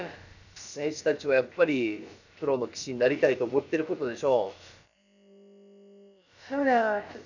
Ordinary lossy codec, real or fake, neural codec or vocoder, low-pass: none; fake; codec, 16 kHz, about 1 kbps, DyCAST, with the encoder's durations; 7.2 kHz